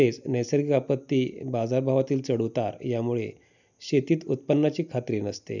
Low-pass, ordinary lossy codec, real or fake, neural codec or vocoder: 7.2 kHz; none; real; none